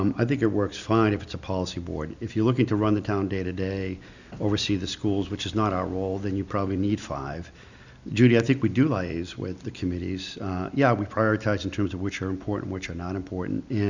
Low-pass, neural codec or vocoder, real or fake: 7.2 kHz; none; real